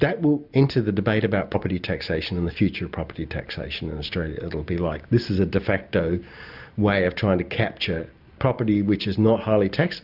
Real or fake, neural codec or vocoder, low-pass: real; none; 5.4 kHz